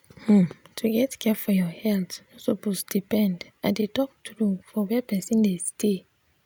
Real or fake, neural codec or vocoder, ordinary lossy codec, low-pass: real; none; none; none